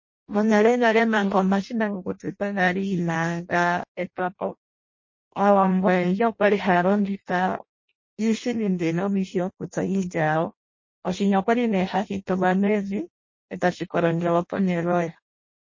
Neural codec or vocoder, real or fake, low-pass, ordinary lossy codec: codec, 16 kHz in and 24 kHz out, 0.6 kbps, FireRedTTS-2 codec; fake; 7.2 kHz; MP3, 32 kbps